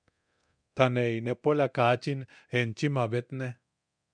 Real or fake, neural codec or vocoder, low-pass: fake; codec, 24 kHz, 0.9 kbps, DualCodec; 9.9 kHz